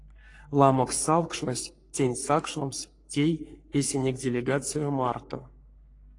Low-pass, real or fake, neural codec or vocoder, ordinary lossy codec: 10.8 kHz; fake; codec, 44.1 kHz, 3.4 kbps, Pupu-Codec; AAC, 48 kbps